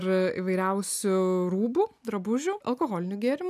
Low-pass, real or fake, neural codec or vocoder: 14.4 kHz; real; none